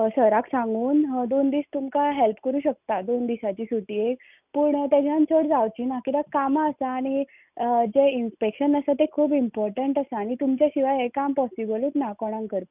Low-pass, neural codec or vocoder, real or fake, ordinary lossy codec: 3.6 kHz; none; real; none